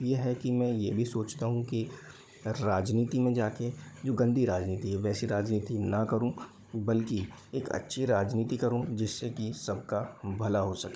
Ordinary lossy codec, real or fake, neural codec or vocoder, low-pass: none; fake; codec, 16 kHz, 16 kbps, FunCodec, trained on Chinese and English, 50 frames a second; none